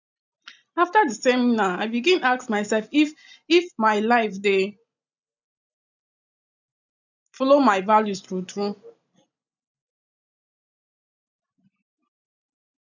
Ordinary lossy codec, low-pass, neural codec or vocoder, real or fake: none; 7.2 kHz; none; real